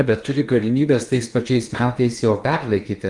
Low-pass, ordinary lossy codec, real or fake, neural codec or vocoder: 10.8 kHz; Opus, 32 kbps; fake; codec, 16 kHz in and 24 kHz out, 0.8 kbps, FocalCodec, streaming, 65536 codes